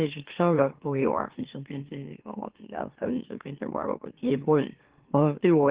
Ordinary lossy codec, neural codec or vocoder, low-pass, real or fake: Opus, 16 kbps; autoencoder, 44.1 kHz, a latent of 192 numbers a frame, MeloTTS; 3.6 kHz; fake